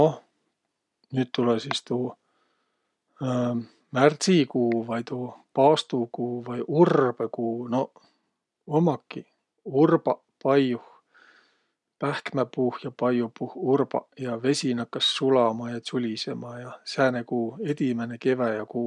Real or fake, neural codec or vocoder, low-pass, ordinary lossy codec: real; none; 10.8 kHz; none